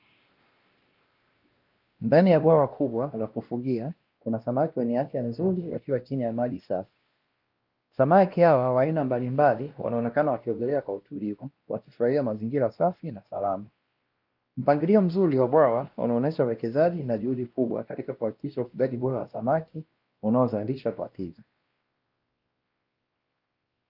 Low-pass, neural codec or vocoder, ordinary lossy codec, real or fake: 5.4 kHz; codec, 16 kHz, 1 kbps, X-Codec, WavLM features, trained on Multilingual LibriSpeech; Opus, 24 kbps; fake